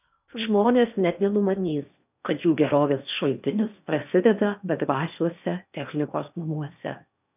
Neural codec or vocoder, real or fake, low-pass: codec, 16 kHz in and 24 kHz out, 0.8 kbps, FocalCodec, streaming, 65536 codes; fake; 3.6 kHz